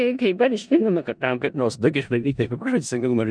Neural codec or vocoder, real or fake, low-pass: codec, 16 kHz in and 24 kHz out, 0.4 kbps, LongCat-Audio-Codec, four codebook decoder; fake; 9.9 kHz